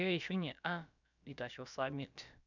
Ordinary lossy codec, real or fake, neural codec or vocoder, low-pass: none; fake; codec, 16 kHz, about 1 kbps, DyCAST, with the encoder's durations; 7.2 kHz